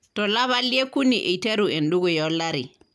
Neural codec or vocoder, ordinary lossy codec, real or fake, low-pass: none; none; real; none